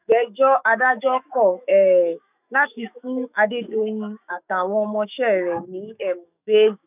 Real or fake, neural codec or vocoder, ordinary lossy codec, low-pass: fake; autoencoder, 48 kHz, 128 numbers a frame, DAC-VAE, trained on Japanese speech; none; 3.6 kHz